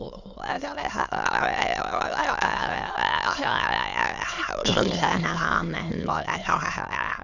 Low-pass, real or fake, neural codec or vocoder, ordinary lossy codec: 7.2 kHz; fake; autoencoder, 22.05 kHz, a latent of 192 numbers a frame, VITS, trained on many speakers; none